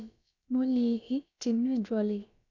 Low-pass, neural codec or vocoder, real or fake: 7.2 kHz; codec, 16 kHz, about 1 kbps, DyCAST, with the encoder's durations; fake